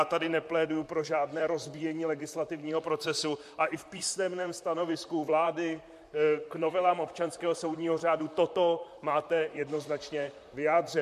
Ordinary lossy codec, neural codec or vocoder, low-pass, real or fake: MP3, 64 kbps; vocoder, 44.1 kHz, 128 mel bands, Pupu-Vocoder; 14.4 kHz; fake